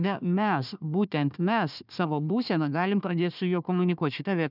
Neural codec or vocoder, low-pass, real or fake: codec, 16 kHz, 1 kbps, FunCodec, trained on Chinese and English, 50 frames a second; 5.4 kHz; fake